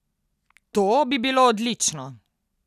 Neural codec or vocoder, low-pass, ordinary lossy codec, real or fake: none; 14.4 kHz; none; real